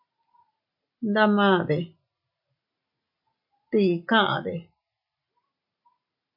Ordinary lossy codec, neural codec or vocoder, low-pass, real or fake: MP3, 48 kbps; none; 5.4 kHz; real